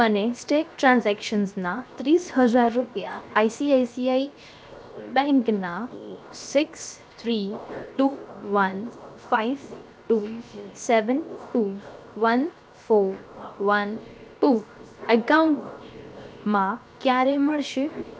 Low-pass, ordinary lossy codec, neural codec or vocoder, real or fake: none; none; codec, 16 kHz, 0.7 kbps, FocalCodec; fake